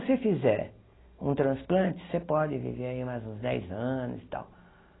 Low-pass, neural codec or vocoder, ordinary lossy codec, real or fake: 7.2 kHz; none; AAC, 16 kbps; real